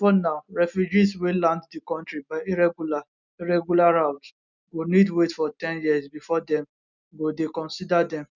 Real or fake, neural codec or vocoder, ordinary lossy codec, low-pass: real; none; none; 7.2 kHz